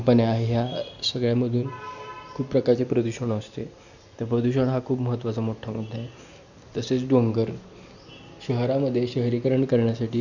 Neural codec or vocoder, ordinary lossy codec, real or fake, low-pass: none; none; real; 7.2 kHz